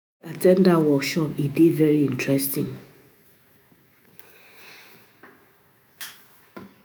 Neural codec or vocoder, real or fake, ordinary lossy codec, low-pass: autoencoder, 48 kHz, 128 numbers a frame, DAC-VAE, trained on Japanese speech; fake; none; none